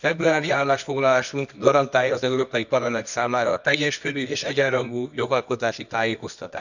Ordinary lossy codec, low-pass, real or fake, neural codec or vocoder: none; 7.2 kHz; fake; codec, 24 kHz, 0.9 kbps, WavTokenizer, medium music audio release